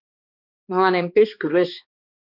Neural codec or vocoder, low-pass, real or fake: codec, 16 kHz, 2 kbps, X-Codec, HuBERT features, trained on balanced general audio; 5.4 kHz; fake